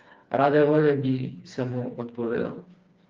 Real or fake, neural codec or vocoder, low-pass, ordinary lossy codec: fake; codec, 16 kHz, 2 kbps, FreqCodec, smaller model; 7.2 kHz; Opus, 32 kbps